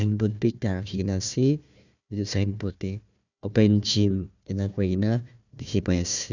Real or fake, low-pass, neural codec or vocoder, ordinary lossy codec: fake; 7.2 kHz; codec, 16 kHz, 1 kbps, FunCodec, trained on Chinese and English, 50 frames a second; none